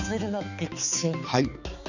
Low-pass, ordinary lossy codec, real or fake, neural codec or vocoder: 7.2 kHz; none; fake; codec, 16 kHz, 4 kbps, X-Codec, HuBERT features, trained on balanced general audio